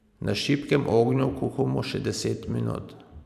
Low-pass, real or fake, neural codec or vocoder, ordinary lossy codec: 14.4 kHz; fake; vocoder, 44.1 kHz, 128 mel bands every 512 samples, BigVGAN v2; none